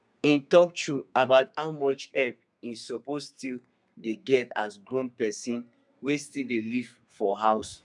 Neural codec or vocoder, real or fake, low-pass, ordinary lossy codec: codec, 32 kHz, 1.9 kbps, SNAC; fake; 10.8 kHz; none